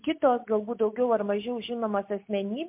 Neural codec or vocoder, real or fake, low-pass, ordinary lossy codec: none; real; 3.6 kHz; MP3, 32 kbps